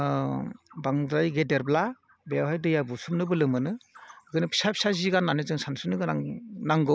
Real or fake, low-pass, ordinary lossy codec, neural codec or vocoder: real; none; none; none